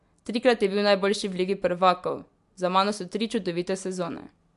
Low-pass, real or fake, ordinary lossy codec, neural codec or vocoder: 10.8 kHz; real; MP3, 64 kbps; none